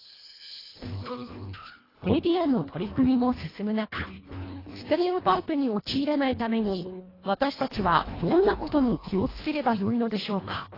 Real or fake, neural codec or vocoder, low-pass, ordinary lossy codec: fake; codec, 24 kHz, 1.5 kbps, HILCodec; 5.4 kHz; AAC, 24 kbps